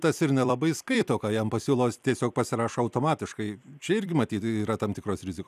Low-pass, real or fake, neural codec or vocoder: 14.4 kHz; fake; vocoder, 44.1 kHz, 128 mel bands every 256 samples, BigVGAN v2